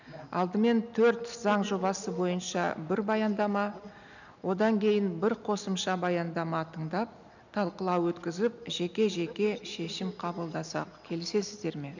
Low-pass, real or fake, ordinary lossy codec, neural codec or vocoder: 7.2 kHz; real; none; none